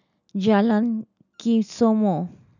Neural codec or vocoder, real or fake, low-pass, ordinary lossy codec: none; real; 7.2 kHz; none